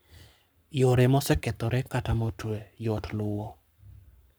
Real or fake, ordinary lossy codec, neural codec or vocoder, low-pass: fake; none; codec, 44.1 kHz, 7.8 kbps, Pupu-Codec; none